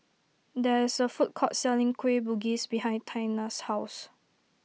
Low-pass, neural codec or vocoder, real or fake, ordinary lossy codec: none; none; real; none